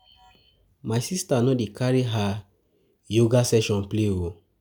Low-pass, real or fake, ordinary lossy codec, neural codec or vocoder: none; real; none; none